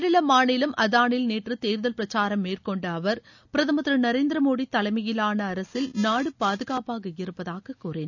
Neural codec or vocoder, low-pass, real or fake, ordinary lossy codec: none; 7.2 kHz; real; none